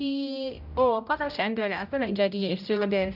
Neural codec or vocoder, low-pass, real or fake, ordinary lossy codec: codec, 16 kHz, 0.5 kbps, X-Codec, HuBERT features, trained on general audio; 5.4 kHz; fake; none